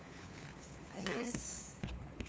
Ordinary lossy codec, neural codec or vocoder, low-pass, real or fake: none; codec, 16 kHz, 4 kbps, FunCodec, trained on LibriTTS, 50 frames a second; none; fake